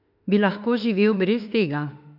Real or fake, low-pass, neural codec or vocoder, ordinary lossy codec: fake; 5.4 kHz; autoencoder, 48 kHz, 32 numbers a frame, DAC-VAE, trained on Japanese speech; none